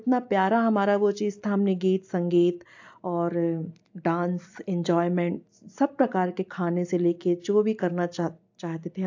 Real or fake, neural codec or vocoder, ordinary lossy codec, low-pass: real; none; AAC, 48 kbps; 7.2 kHz